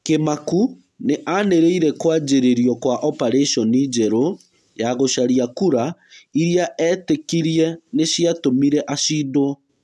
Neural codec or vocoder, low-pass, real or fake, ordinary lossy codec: none; none; real; none